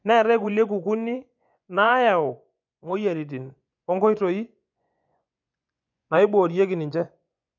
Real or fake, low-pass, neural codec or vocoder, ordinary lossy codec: fake; 7.2 kHz; vocoder, 24 kHz, 100 mel bands, Vocos; none